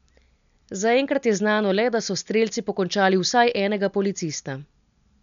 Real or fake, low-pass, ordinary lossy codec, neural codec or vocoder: real; 7.2 kHz; none; none